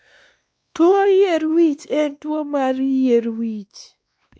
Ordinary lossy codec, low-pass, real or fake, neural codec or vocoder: none; none; fake; codec, 16 kHz, 2 kbps, X-Codec, WavLM features, trained on Multilingual LibriSpeech